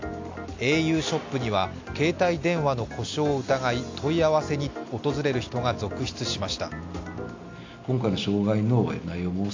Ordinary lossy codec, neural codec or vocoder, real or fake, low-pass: AAC, 48 kbps; none; real; 7.2 kHz